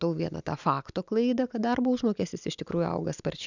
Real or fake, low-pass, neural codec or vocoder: real; 7.2 kHz; none